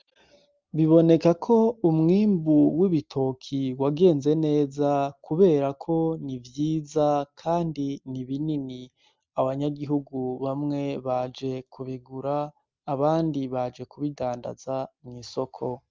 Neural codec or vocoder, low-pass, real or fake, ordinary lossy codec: none; 7.2 kHz; real; Opus, 32 kbps